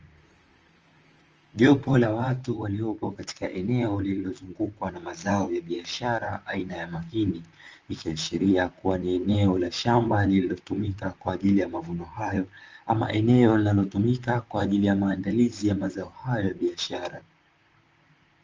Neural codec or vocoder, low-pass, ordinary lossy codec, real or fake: vocoder, 44.1 kHz, 128 mel bands, Pupu-Vocoder; 7.2 kHz; Opus, 24 kbps; fake